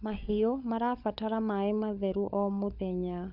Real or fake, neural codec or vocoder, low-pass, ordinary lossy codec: fake; codec, 16 kHz, 16 kbps, FunCodec, trained on LibriTTS, 50 frames a second; 5.4 kHz; none